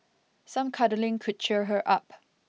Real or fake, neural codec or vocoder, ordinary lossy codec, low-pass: real; none; none; none